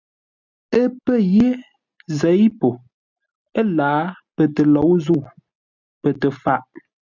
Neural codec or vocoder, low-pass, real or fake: none; 7.2 kHz; real